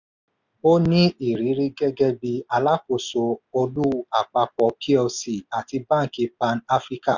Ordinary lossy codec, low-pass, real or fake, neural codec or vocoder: none; 7.2 kHz; real; none